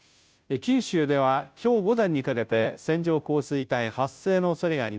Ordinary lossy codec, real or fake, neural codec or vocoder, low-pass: none; fake; codec, 16 kHz, 0.5 kbps, FunCodec, trained on Chinese and English, 25 frames a second; none